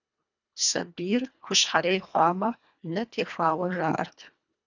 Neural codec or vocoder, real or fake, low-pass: codec, 24 kHz, 1.5 kbps, HILCodec; fake; 7.2 kHz